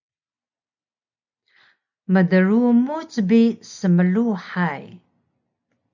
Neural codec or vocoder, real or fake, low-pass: none; real; 7.2 kHz